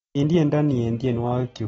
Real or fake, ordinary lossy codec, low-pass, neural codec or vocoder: real; AAC, 24 kbps; 19.8 kHz; none